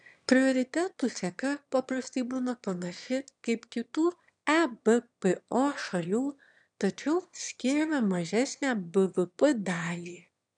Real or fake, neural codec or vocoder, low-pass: fake; autoencoder, 22.05 kHz, a latent of 192 numbers a frame, VITS, trained on one speaker; 9.9 kHz